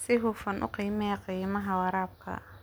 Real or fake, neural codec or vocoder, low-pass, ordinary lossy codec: real; none; none; none